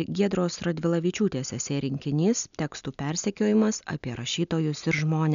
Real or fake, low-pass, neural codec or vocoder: real; 7.2 kHz; none